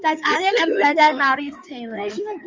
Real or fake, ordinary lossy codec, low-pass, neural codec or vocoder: fake; Opus, 32 kbps; 7.2 kHz; codec, 16 kHz in and 24 kHz out, 2.2 kbps, FireRedTTS-2 codec